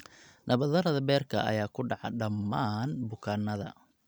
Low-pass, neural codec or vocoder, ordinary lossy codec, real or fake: none; none; none; real